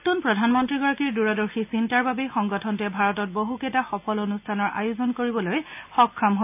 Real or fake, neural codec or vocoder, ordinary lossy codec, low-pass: real; none; none; 3.6 kHz